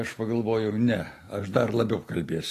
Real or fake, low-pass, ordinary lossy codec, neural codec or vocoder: real; 14.4 kHz; AAC, 48 kbps; none